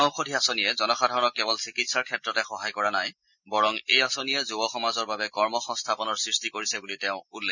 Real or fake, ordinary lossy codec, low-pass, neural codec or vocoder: real; none; 7.2 kHz; none